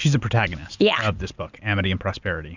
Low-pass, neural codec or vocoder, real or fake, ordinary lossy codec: 7.2 kHz; none; real; Opus, 64 kbps